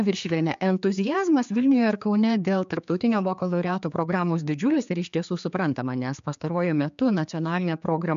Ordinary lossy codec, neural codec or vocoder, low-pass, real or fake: MP3, 64 kbps; codec, 16 kHz, 4 kbps, X-Codec, HuBERT features, trained on general audio; 7.2 kHz; fake